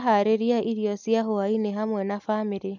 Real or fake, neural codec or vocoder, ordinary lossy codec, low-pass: real; none; none; 7.2 kHz